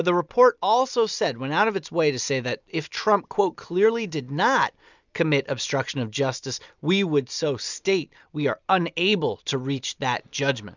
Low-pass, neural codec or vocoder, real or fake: 7.2 kHz; none; real